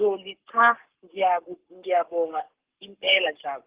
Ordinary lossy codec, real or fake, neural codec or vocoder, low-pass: Opus, 16 kbps; fake; codec, 44.1 kHz, 7.8 kbps, Pupu-Codec; 3.6 kHz